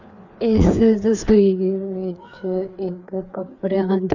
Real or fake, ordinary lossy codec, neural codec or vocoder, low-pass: fake; none; codec, 16 kHz, 4 kbps, FreqCodec, larger model; 7.2 kHz